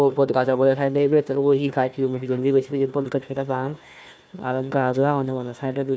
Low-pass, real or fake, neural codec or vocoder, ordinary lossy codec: none; fake; codec, 16 kHz, 1 kbps, FunCodec, trained on Chinese and English, 50 frames a second; none